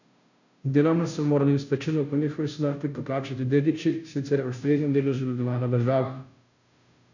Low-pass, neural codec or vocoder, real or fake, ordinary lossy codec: 7.2 kHz; codec, 16 kHz, 0.5 kbps, FunCodec, trained on Chinese and English, 25 frames a second; fake; none